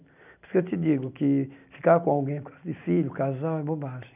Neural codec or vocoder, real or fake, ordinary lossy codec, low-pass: none; real; none; 3.6 kHz